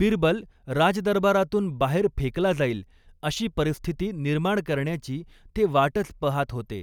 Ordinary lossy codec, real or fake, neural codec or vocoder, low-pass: none; real; none; 19.8 kHz